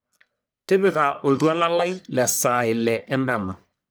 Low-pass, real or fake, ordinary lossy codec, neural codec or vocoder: none; fake; none; codec, 44.1 kHz, 1.7 kbps, Pupu-Codec